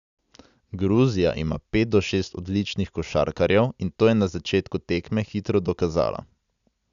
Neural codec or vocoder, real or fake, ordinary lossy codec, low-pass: none; real; none; 7.2 kHz